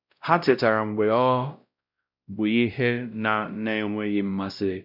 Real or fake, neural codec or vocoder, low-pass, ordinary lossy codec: fake; codec, 16 kHz, 0.5 kbps, X-Codec, WavLM features, trained on Multilingual LibriSpeech; 5.4 kHz; none